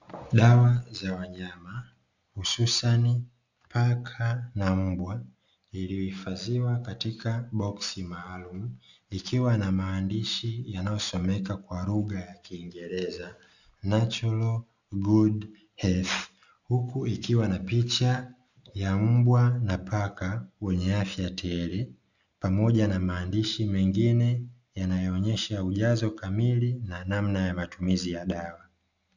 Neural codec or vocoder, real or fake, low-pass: none; real; 7.2 kHz